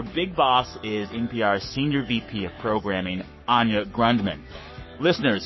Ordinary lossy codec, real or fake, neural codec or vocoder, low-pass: MP3, 24 kbps; fake; codec, 44.1 kHz, 7.8 kbps, Pupu-Codec; 7.2 kHz